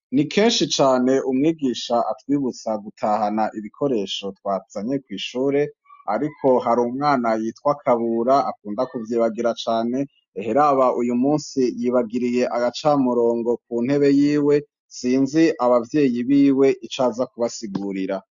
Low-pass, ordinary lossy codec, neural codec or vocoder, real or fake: 7.2 kHz; MP3, 64 kbps; none; real